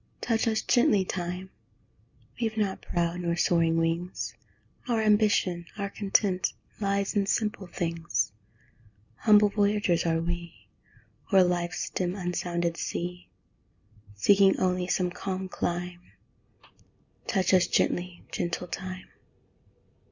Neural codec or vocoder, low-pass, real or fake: none; 7.2 kHz; real